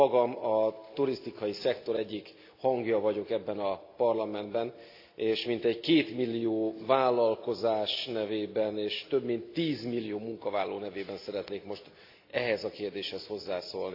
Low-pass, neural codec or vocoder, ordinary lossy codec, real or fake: 5.4 kHz; none; AAC, 32 kbps; real